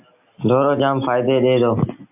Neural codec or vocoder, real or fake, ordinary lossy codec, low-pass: none; real; MP3, 32 kbps; 3.6 kHz